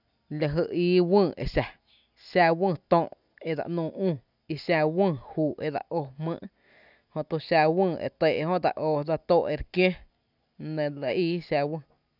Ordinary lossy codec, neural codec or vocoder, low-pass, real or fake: none; none; 5.4 kHz; real